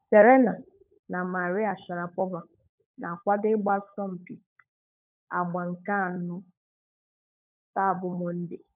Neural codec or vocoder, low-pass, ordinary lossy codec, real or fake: codec, 16 kHz, 16 kbps, FunCodec, trained on LibriTTS, 50 frames a second; 3.6 kHz; none; fake